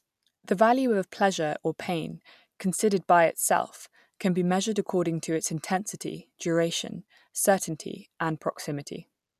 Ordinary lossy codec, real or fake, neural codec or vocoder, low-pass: none; real; none; 14.4 kHz